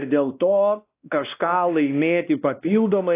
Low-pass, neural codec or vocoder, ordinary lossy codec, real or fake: 3.6 kHz; codec, 16 kHz, 1 kbps, X-Codec, WavLM features, trained on Multilingual LibriSpeech; AAC, 24 kbps; fake